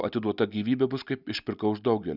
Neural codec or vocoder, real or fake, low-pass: none; real; 5.4 kHz